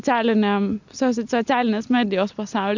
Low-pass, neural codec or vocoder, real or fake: 7.2 kHz; none; real